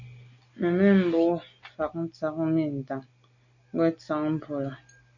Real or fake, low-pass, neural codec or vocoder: real; 7.2 kHz; none